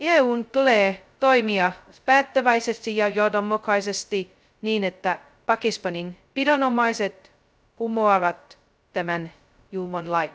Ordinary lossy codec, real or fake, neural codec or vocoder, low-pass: none; fake; codec, 16 kHz, 0.2 kbps, FocalCodec; none